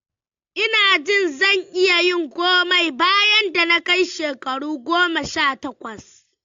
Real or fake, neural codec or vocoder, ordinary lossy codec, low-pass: real; none; AAC, 48 kbps; 7.2 kHz